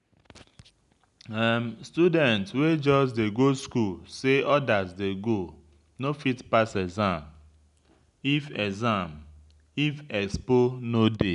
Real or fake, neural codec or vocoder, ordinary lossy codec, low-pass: real; none; none; 10.8 kHz